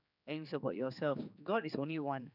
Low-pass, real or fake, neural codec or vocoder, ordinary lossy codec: 5.4 kHz; fake; codec, 16 kHz, 4 kbps, X-Codec, HuBERT features, trained on general audio; none